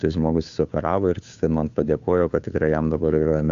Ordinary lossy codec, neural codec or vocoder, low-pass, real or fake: Opus, 64 kbps; codec, 16 kHz, 2 kbps, FunCodec, trained on Chinese and English, 25 frames a second; 7.2 kHz; fake